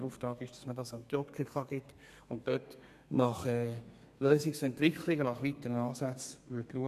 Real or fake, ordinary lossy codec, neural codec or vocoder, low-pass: fake; none; codec, 32 kHz, 1.9 kbps, SNAC; 14.4 kHz